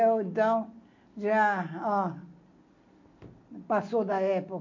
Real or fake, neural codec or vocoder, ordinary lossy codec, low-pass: real; none; MP3, 64 kbps; 7.2 kHz